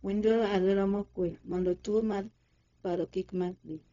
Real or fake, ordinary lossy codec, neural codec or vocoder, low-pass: fake; none; codec, 16 kHz, 0.4 kbps, LongCat-Audio-Codec; 7.2 kHz